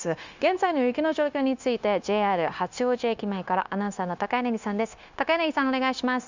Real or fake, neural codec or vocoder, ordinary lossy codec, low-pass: fake; codec, 16 kHz, 0.9 kbps, LongCat-Audio-Codec; Opus, 64 kbps; 7.2 kHz